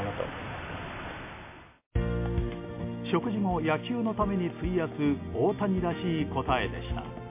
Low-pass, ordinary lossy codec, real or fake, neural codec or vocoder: 3.6 kHz; MP3, 24 kbps; real; none